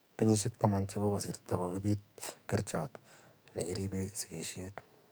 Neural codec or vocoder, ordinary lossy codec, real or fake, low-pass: codec, 44.1 kHz, 2.6 kbps, SNAC; none; fake; none